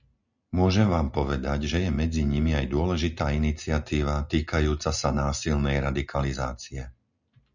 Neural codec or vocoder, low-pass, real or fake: none; 7.2 kHz; real